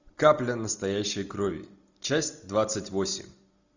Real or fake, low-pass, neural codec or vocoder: real; 7.2 kHz; none